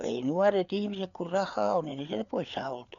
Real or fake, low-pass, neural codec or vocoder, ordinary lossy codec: fake; 7.2 kHz; codec, 16 kHz, 4 kbps, FreqCodec, larger model; MP3, 96 kbps